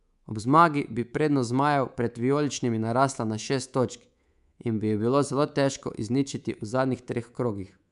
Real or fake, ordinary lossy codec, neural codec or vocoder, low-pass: fake; none; codec, 24 kHz, 3.1 kbps, DualCodec; 10.8 kHz